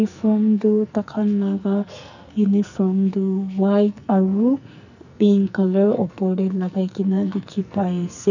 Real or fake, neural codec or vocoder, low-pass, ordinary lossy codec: fake; codec, 44.1 kHz, 2.6 kbps, SNAC; 7.2 kHz; none